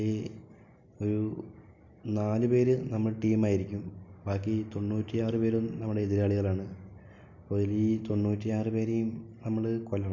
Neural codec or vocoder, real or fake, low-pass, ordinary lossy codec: none; real; 7.2 kHz; MP3, 64 kbps